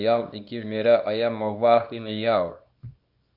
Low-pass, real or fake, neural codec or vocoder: 5.4 kHz; fake; codec, 24 kHz, 0.9 kbps, WavTokenizer, small release